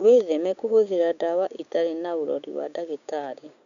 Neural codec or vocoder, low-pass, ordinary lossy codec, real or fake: codec, 16 kHz, 6 kbps, DAC; 7.2 kHz; none; fake